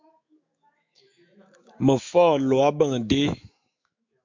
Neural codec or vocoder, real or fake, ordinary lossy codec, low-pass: autoencoder, 48 kHz, 128 numbers a frame, DAC-VAE, trained on Japanese speech; fake; MP3, 64 kbps; 7.2 kHz